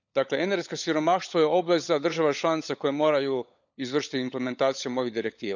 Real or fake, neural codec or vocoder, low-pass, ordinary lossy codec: fake; codec, 16 kHz, 16 kbps, FunCodec, trained on LibriTTS, 50 frames a second; 7.2 kHz; none